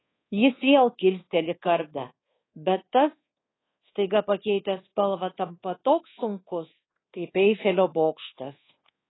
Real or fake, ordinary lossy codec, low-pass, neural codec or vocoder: fake; AAC, 16 kbps; 7.2 kHz; codec, 24 kHz, 1.2 kbps, DualCodec